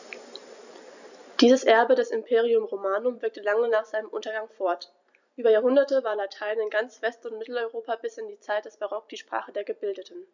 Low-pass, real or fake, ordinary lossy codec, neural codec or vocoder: 7.2 kHz; fake; none; vocoder, 44.1 kHz, 128 mel bands every 256 samples, BigVGAN v2